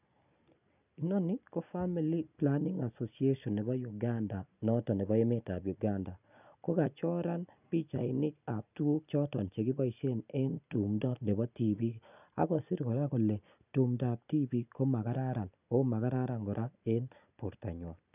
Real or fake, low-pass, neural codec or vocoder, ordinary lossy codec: real; 3.6 kHz; none; none